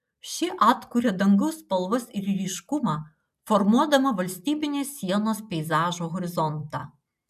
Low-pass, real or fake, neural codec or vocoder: 14.4 kHz; real; none